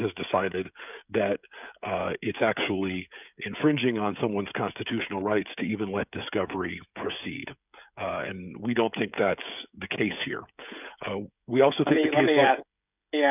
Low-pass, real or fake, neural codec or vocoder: 3.6 kHz; fake; codec, 16 kHz, 16 kbps, FreqCodec, smaller model